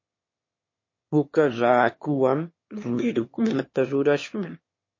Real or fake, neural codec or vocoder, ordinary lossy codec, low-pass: fake; autoencoder, 22.05 kHz, a latent of 192 numbers a frame, VITS, trained on one speaker; MP3, 32 kbps; 7.2 kHz